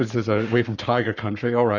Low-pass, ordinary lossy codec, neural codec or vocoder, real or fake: 7.2 kHz; Opus, 64 kbps; codec, 44.1 kHz, 7.8 kbps, Pupu-Codec; fake